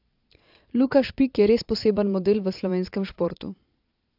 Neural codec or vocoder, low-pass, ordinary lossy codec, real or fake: vocoder, 22.05 kHz, 80 mel bands, Vocos; 5.4 kHz; AAC, 48 kbps; fake